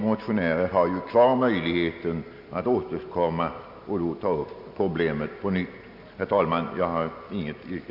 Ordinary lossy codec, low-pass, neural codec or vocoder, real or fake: none; 5.4 kHz; none; real